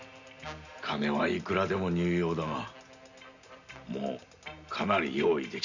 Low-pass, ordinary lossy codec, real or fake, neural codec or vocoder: 7.2 kHz; none; real; none